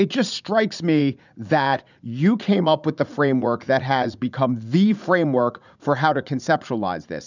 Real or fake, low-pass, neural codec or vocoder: fake; 7.2 kHz; vocoder, 44.1 kHz, 80 mel bands, Vocos